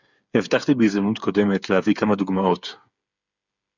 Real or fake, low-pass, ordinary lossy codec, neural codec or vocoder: fake; 7.2 kHz; Opus, 64 kbps; codec, 16 kHz, 8 kbps, FreqCodec, smaller model